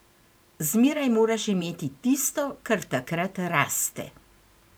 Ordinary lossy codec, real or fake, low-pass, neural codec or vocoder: none; fake; none; vocoder, 44.1 kHz, 128 mel bands every 512 samples, BigVGAN v2